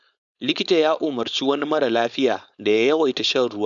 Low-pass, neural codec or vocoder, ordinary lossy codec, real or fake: 7.2 kHz; codec, 16 kHz, 4.8 kbps, FACodec; none; fake